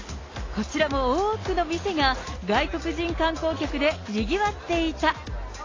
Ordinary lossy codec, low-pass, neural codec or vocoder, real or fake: AAC, 32 kbps; 7.2 kHz; none; real